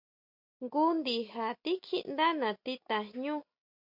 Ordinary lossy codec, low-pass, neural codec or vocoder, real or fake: MP3, 48 kbps; 5.4 kHz; none; real